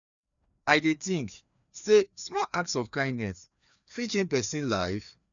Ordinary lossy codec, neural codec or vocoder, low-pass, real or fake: none; codec, 16 kHz, 2 kbps, FreqCodec, larger model; 7.2 kHz; fake